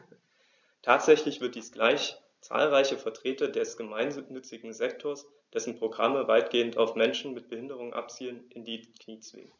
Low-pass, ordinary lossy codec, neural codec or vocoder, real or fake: 7.2 kHz; none; none; real